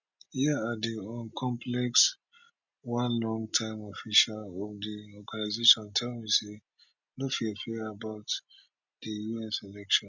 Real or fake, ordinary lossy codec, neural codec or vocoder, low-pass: real; none; none; 7.2 kHz